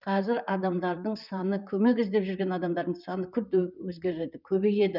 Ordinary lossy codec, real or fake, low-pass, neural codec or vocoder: none; fake; 5.4 kHz; vocoder, 44.1 kHz, 128 mel bands, Pupu-Vocoder